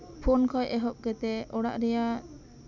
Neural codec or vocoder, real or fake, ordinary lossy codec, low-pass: none; real; none; 7.2 kHz